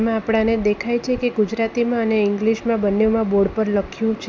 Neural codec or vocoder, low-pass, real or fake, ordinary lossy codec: none; none; real; none